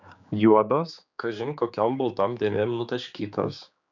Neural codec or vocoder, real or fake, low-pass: codec, 16 kHz, 4 kbps, X-Codec, HuBERT features, trained on general audio; fake; 7.2 kHz